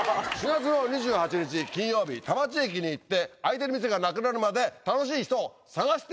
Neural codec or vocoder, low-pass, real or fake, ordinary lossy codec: none; none; real; none